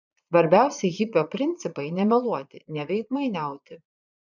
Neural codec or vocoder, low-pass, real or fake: vocoder, 22.05 kHz, 80 mel bands, Vocos; 7.2 kHz; fake